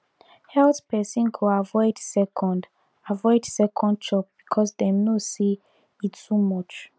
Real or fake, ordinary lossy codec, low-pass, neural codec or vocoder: real; none; none; none